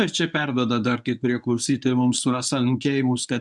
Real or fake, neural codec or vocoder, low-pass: fake; codec, 24 kHz, 0.9 kbps, WavTokenizer, medium speech release version 1; 10.8 kHz